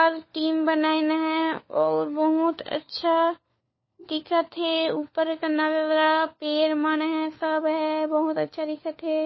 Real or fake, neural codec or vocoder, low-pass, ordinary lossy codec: fake; codec, 16 kHz, 4 kbps, FunCodec, trained on LibriTTS, 50 frames a second; 7.2 kHz; MP3, 24 kbps